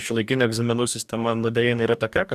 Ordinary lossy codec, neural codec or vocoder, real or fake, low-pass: Opus, 64 kbps; codec, 44.1 kHz, 2.6 kbps, DAC; fake; 14.4 kHz